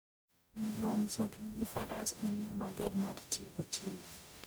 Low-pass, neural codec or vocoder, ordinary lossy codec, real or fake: none; codec, 44.1 kHz, 0.9 kbps, DAC; none; fake